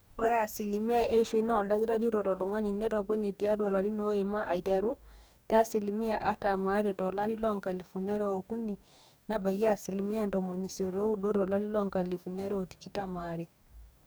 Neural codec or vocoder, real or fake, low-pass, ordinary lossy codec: codec, 44.1 kHz, 2.6 kbps, DAC; fake; none; none